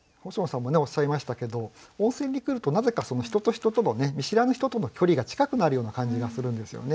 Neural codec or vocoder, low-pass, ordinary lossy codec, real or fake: none; none; none; real